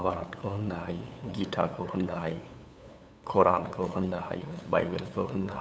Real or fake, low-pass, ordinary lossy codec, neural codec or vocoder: fake; none; none; codec, 16 kHz, 8 kbps, FunCodec, trained on LibriTTS, 25 frames a second